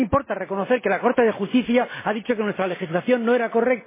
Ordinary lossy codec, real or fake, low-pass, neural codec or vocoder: MP3, 16 kbps; real; 3.6 kHz; none